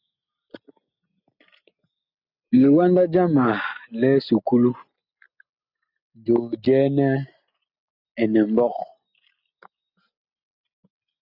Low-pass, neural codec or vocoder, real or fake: 5.4 kHz; none; real